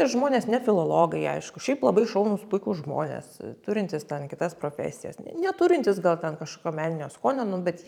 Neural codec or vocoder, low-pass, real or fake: vocoder, 44.1 kHz, 128 mel bands every 512 samples, BigVGAN v2; 19.8 kHz; fake